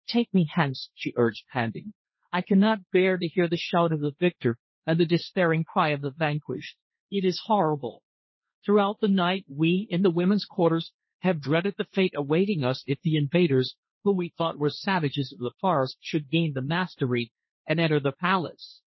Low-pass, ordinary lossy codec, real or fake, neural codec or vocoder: 7.2 kHz; MP3, 24 kbps; fake; codec, 16 kHz, 1.1 kbps, Voila-Tokenizer